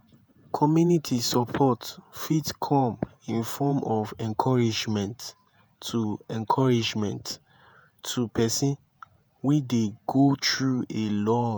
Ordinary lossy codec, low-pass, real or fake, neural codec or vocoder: none; none; fake; vocoder, 48 kHz, 128 mel bands, Vocos